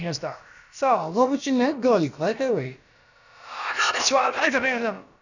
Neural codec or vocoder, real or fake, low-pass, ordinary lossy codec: codec, 16 kHz, about 1 kbps, DyCAST, with the encoder's durations; fake; 7.2 kHz; none